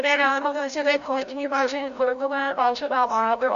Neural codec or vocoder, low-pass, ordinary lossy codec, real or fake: codec, 16 kHz, 0.5 kbps, FreqCodec, larger model; 7.2 kHz; MP3, 96 kbps; fake